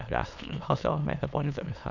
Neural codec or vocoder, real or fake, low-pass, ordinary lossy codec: autoencoder, 22.05 kHz, a latent of 192 numbers a frame, VITS, trained on many speakers; fake; 7.2 kHz; none